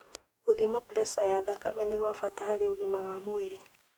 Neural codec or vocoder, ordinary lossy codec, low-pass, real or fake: codec, 44.1 kHz, 2.6 kbps, DAC; none; none; fake